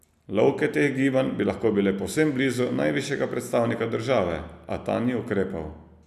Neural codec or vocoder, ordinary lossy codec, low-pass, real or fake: none; none; 14.4 kHz; real